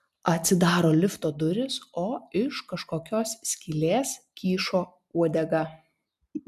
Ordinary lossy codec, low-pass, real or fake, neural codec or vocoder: MP3, 96 kbps; 14.4 kHz; real; none